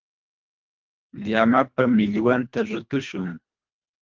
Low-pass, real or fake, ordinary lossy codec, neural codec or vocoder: 7.2 kHz; fake; Opus, 24 kbps; codec, 24 kHz, 1.5 kbps, HILCodec